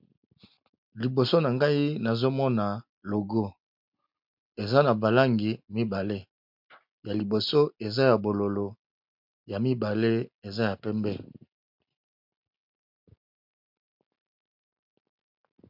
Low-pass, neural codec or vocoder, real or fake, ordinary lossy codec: 5.4 kHz; none; real; MP3, 48 kbps